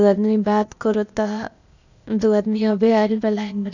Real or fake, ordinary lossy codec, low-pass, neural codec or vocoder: fake; none; 7.2 kHz; codec, 16 kHz, 0.8 kbps, ZipCodec